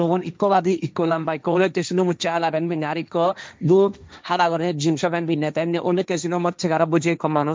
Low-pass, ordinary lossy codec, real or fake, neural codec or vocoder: none; none; fake; codec, 16 kHz, 1.1 kbps, Voila-Tokenizer